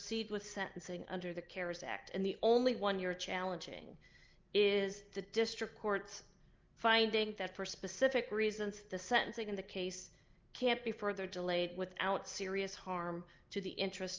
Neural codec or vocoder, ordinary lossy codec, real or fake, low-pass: none; Opus, 24 kbps; real; 7.2 kHz